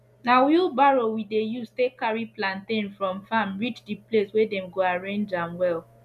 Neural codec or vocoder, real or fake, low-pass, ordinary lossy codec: none; real; 14.4 kHz; none